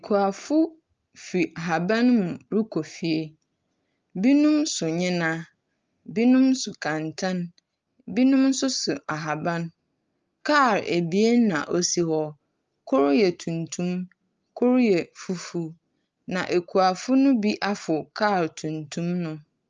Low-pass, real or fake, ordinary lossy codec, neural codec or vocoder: 7.2 kHz; real; Opus, 24 kbps; none